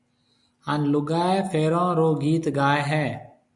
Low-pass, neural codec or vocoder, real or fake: 10.8 kHz; none; real